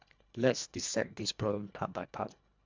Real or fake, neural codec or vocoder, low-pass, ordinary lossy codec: fake; codec, 24 kHz, 1.5 kbps, HILCodec; 7.2 kHz; MP3, 64 kbps